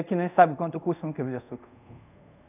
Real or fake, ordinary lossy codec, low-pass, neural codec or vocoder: fake; none; 3.6 kHz; codec, 24 kHz, 0.5 kbps, DualCodec